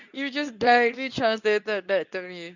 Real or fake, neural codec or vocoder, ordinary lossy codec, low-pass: fake; codec, 24 kHz, 0.9 kbps, WavTokenizer, medium speech release version 2; none; 7.2 kHz